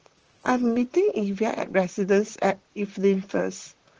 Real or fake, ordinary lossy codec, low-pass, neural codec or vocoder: fake; Opus, 16 kbps; 7.2 kHz; vocoder, 44.1 kHz, 128 mel bands, Pupu-Vocoder